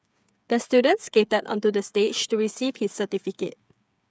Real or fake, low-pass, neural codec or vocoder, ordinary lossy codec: fake; none; codec, 16 kHz, 8 kbps, FreqCodec, smaller model; none